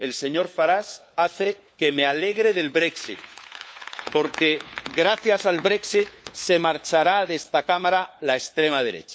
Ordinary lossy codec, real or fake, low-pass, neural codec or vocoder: none; fake; none; codec, 16 kHz, 4 kbps, FunCodec, trained on LibriTTS, 50 frames a second